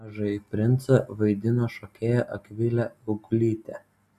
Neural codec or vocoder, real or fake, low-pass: none; real; 14.4 kHz